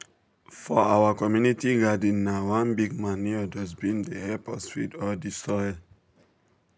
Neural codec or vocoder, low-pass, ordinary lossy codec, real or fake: none; none; none; real